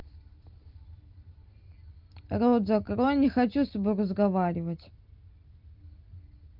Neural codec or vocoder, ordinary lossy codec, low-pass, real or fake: none; Opus, 32 kbps; 5.4 kHz; real